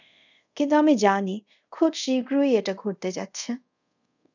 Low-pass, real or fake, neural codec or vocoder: 7.2 kHz; fake; codec, 24 kHz, 0.5 kbps, DualCodec